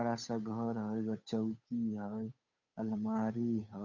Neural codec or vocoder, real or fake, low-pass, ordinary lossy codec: codec, 16 kHz, 8 kbps, FunCodec, trained on Chinese and English, 25 frames a second; fake; 7.2 kHz; none